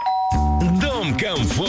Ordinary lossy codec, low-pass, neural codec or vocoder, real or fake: none; none; none; real